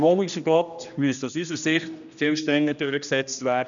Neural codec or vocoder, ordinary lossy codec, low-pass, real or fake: codec, 16 kHz, 1 kbps, X-Codec, HuBERT features, trained on general audio; none; 7.2 kHz; fake